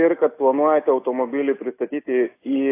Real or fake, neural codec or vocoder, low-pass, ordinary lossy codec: real; none; 3.6 kHz; AAC, 24 kbps